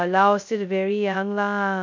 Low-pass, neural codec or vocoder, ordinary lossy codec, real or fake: 7.2 kHz; codec, 16 kHz, 0.2 kbps, FocalCodec; MP3, 64 kbps; fake